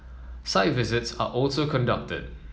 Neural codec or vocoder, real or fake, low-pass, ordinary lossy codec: none; real; none; none